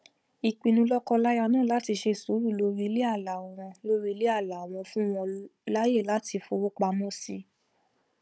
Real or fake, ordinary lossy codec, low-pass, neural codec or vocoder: fake; none; none; codec, 16 kHz, 16 kbps, FunCodec, trained on Chinese and English, 50 frames a second